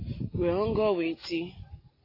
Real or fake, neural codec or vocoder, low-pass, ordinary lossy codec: real; none; 5.4 kHz; AAC, 24 kbps